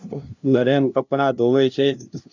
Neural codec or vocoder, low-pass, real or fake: codec, 16 kHz, 0.5 kbps, FunCodec, trained on LibriTTS, 25 frames a second; 7.2 kHz; fake